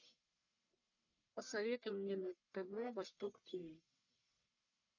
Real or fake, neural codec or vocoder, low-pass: fake; codec, 44.1 kHz, 1.7 kbps, Pupu-Codec; 7.2 kHz